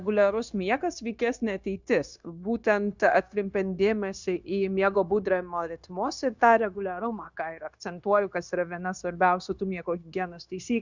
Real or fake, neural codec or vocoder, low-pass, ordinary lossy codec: fake; codec, 16 kHz, 0.9 kbps, LongCat-Audio-Codec; 7.2 kHz; Opus, 64 kbps